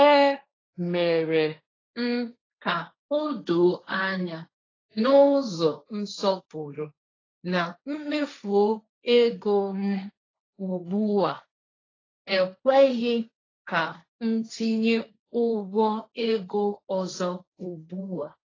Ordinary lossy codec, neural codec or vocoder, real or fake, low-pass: AAC, 32 kbps; codec, 16 kHz, 1.1 kbps, Voila-Tokenizer; fake; 7.2 kHz